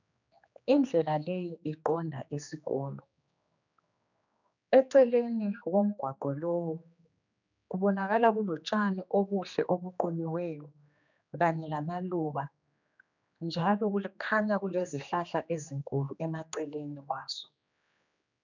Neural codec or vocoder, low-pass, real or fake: codec, 16 kHz, 2 kbps, X-Codec, HuBERT features, trained on general audio; 7.2 kHz; fake